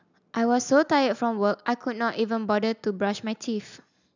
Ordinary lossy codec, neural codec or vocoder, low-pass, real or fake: none; none; 7.2 kHz; real